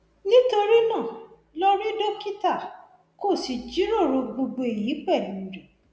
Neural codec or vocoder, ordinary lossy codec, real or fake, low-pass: none; none; real; none